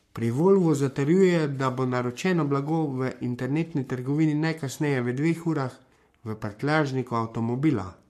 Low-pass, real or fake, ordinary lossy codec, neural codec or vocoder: 14.4 kHz; fake; MP3, 64 kbps; codec, 44.1 kHz, 7.8 kbps, Pupu-Codec